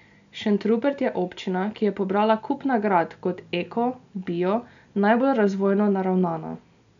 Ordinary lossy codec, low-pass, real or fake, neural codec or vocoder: none; 7.2 kHz; real; none